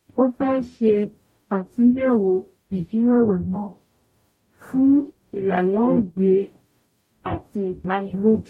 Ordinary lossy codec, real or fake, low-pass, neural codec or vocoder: MP3, 64 kbps; fake; 19.8 kHz; codec, 44.1 kHz, 0.9 kbps, DAC